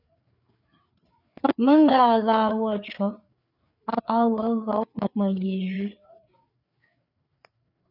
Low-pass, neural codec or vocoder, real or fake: 5.4 kHz; codec, 16 kHz, 4 kbps, FreqCodec, larger model; fake